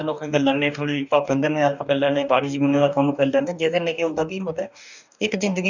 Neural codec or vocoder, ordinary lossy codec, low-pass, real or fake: codec, 44.1 kHz, 2.6 kbps, DAC; none; 7.2 kHz; fake